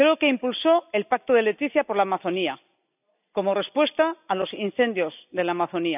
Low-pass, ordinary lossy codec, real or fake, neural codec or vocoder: 3.6 kHz; none; real; none